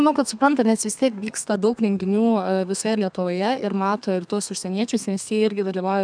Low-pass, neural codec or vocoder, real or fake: 9.9 kHz; codec, 32 kHz, 1.9 kbps, SNAC; fake